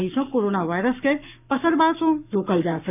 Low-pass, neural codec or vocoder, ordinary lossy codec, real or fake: 3.6 kHz; codec, 44.1 kHz, 7.8 kbps, Pupu-Codec; none; fake